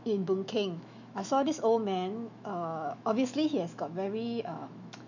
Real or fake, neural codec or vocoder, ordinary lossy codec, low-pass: fake; autoencoder, 48 kHz, 128 numbers a frame, DAC-VAE, trained on Japanese speech; none; 7.2 kHz